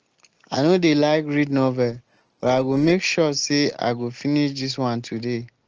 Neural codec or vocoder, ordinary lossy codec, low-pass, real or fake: none; Opus, 16 kbps; 7.2 kHz; real